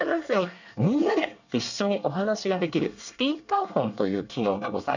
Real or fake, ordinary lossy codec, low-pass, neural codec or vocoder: fake; none; 7.2 kHz; codec, 24 kHz, 1 kbps, SNAC